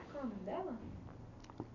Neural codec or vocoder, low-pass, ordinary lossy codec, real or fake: none; 7.2 kHz; none; real